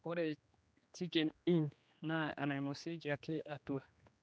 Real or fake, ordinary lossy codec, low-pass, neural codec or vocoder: fake; none; none; codec, 16 kHz, 2 kbps, X-Codec, HuBERT features, trained on general audio